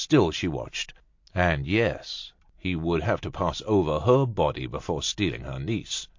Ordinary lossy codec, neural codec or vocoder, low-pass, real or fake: MP3, 48 kbps; none; 7.2 kHz; real